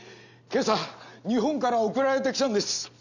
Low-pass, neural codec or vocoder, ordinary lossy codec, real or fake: 7.2 kHz; none; none; real